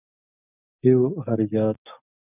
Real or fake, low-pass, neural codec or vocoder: real; 3.6 kHz; none